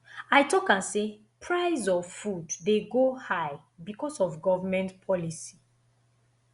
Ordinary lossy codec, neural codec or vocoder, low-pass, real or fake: Opus, 64 kbps; none; 10.8 kHz; real